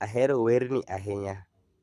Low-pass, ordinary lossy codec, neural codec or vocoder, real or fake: none; none; codec, 24 kHz, 6 kbps, HILCodec; fake